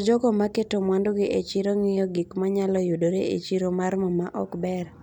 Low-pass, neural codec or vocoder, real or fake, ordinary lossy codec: 19.8 kHz; none; real; none